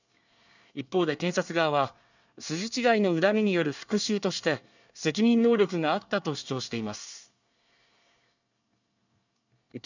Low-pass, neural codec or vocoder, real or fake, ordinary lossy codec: 7.2 kHz; codec, 24 kHz, 1 kbps, SNAC; fake; none